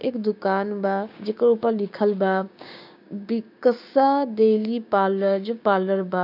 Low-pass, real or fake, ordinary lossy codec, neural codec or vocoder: 5.4 kHz; fake; none; codec, 16 kHz, 6 kbps, DAC